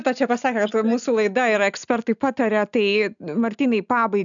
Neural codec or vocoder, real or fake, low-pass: none; real; 7.2 kHz